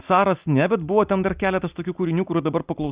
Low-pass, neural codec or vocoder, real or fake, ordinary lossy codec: 3.6 kHz; none; real; Opus, 64 kbps